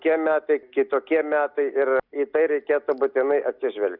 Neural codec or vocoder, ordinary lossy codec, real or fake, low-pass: none; Opus, 64 kbps; real; 5.4 kHz